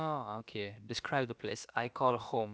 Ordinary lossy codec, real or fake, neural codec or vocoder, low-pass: none; fake; codec, 16 kHz, about 1 kbps, DyCAST, with the encoder's durations; none